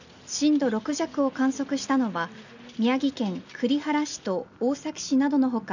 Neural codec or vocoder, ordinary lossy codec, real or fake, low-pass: none; none; real; 7.2 kHz